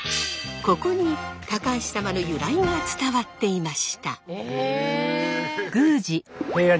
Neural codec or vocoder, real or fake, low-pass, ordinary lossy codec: none; real; none; none